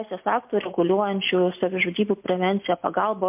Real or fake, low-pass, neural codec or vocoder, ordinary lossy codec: real; 3.6 kHz; none; MP3, 32 kbps